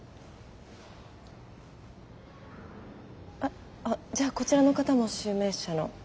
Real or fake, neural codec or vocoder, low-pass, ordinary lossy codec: real; none; none; none